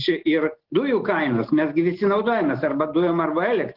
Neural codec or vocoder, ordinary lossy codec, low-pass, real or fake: none; Opus, 16 kbps; 5.4 kHz; real